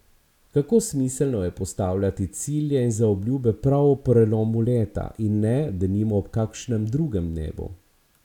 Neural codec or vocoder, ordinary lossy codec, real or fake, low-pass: none; none; real; 19.8 kHz